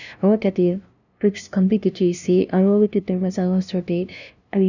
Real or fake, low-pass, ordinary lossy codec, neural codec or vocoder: fake; 7.2 kHz; none; codec, 16 kHz, 0.5 kbps, FunCodec, trained on LibriTTS, 25 frames a second